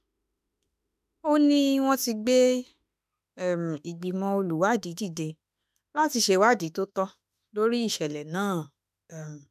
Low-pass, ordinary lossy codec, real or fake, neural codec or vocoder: 14.4 kHz; none; fake; autoencoder, 48 kHz, 32 numbers a frame, DAC-VAE, trained on Japanese speech